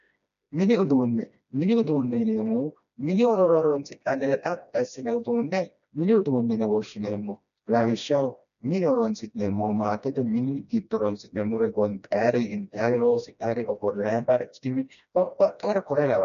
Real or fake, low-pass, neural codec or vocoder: fake; 7.2 kHz; codec, 16 kHz, 1 kbps, FreqCodec, smaller model